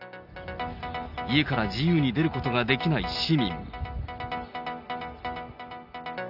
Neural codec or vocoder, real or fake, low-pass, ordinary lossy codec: none; real; 5.4 kHz; none